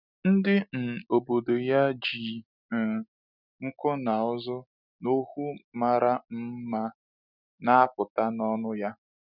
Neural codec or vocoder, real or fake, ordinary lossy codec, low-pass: none; real; none; 5.4 kHz